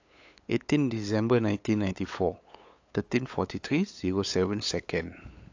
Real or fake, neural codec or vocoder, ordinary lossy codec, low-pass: fake; codec, 16 kHz, 8 kbps, FunCodec, trained on LibriTTS, 25 frames a second; AAC, 48 kbps; 7.2 kHz